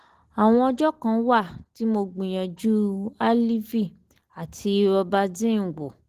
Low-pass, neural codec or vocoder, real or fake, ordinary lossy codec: 14.4 kHz; none; real; Opus, 16 kbps